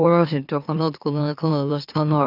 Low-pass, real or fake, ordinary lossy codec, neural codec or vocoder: 5.4 kHz; fake; none; autoencoder, 44.1 kHz, a latent of 192 numbers a frame, MeloTTS